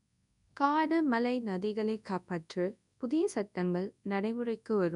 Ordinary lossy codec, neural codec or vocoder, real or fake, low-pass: none; codec, 24 kHz, 0.9 kbps, WavTokenizer, large speech release; fake; 10.8 kHz